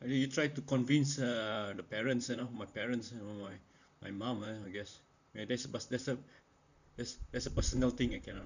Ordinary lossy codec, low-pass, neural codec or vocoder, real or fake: none; 7.2 kHz; vocoder, 44.1 kHz, 128 mel bands, Pupu-Vocoder; fake